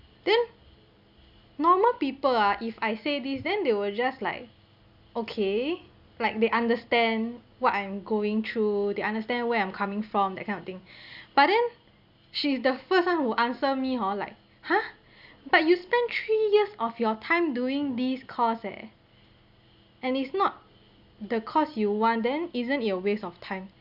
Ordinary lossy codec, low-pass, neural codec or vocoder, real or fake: Opus, 64 kbps; 5.4 kHz; none; real